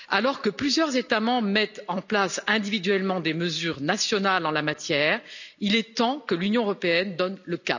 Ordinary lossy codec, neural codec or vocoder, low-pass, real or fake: none; none; 7.2 kHz; real